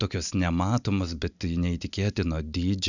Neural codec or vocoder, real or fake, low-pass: none; real; 7.2 kHz